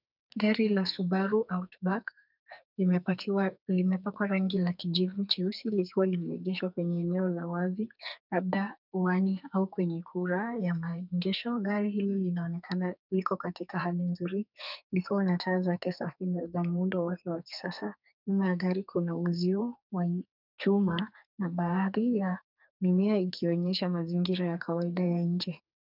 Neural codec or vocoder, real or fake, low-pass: codec, 44.1 kHz, 2.6 kbps, SNAC; fake; 5.4 kHz